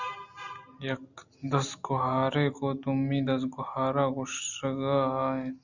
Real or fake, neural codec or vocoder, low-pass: real; none; 7.2 kHz